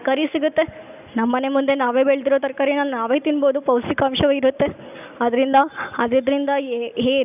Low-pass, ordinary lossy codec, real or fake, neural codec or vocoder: 3.6 kHz; none; real; none